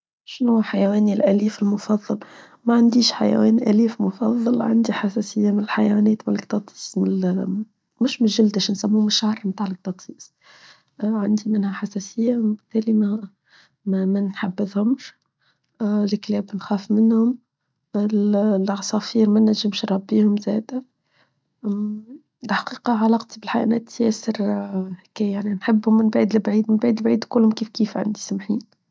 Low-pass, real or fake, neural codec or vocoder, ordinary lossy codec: none; real; none; none